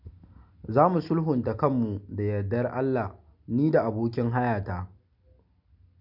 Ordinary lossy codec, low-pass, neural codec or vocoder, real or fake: none; 5.4 kHz; none; real